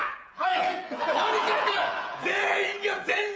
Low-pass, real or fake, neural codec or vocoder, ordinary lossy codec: none; fake; codec, 16 kHz, 8 kbps, FreqCodec, smaller model; none